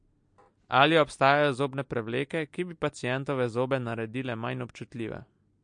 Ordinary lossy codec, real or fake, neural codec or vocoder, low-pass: MP3, 48 kbps; real; none; 9.9 kHz